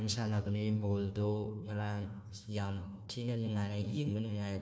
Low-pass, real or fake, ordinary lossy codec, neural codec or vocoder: none; fake; none; codec, 16 kHz, 1 kbps, FunCodec, trained on Chinese and English, 50 frames a second